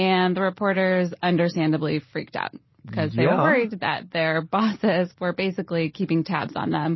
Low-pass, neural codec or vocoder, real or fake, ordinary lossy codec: 7.2 kHz; none; real; MP3, 24 kbps